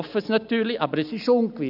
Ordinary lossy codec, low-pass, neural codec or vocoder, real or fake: none; 5.4 kHz; none; real